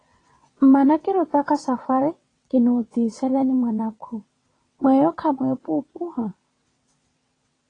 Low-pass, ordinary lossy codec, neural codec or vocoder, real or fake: 9.9 kHz; AAC, 32 kbps; vocoder, 22.05 kHz, 80 mel bands, WaveNeXt; fake